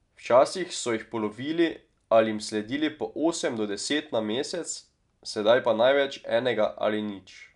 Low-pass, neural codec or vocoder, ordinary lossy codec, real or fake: 10.8 kHz; none; none; real